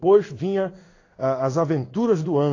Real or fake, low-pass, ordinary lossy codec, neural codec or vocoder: fake; 7.2 kHz; AAC, 32 kbps; codec, 16 kHz in and 24 kHz out, 1 kbps, XY-Tokenizer